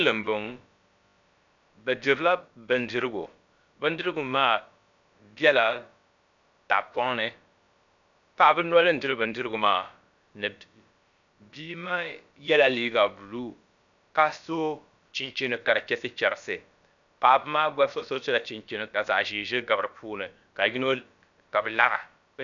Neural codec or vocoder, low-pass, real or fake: codec, 16 kHz, about 1 kbps, DyCAST, with the encoder's durations; 7.2 kHz; fake